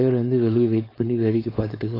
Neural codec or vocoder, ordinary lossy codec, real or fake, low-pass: codec, 16 kHz, 4.8 kbps, FACodec; AAC, 24 kbps; fake; 5.4 kHz